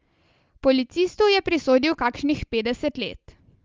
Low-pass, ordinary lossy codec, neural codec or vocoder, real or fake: 7.2 kHz; Opus, 24 kbps; none; real